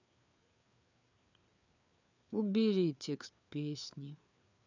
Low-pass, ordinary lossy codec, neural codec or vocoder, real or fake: 7.2 kHz; none; codec, 16 kHz, 4 kbps, FreqCodec, larger model; fake